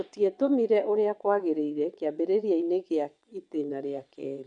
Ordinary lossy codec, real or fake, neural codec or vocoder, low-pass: none; fake; vocoder, 22.05 kHz, 80 mel bands, WaveNeXt; 9.9 kHz